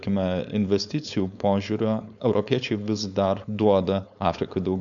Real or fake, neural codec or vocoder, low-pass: fake; codec, 16 kHz, 4.8 kbps, FACodec; 7.2 kHz